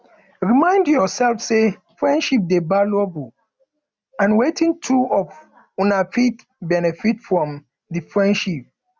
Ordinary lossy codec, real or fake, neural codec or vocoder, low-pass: none; real; none; none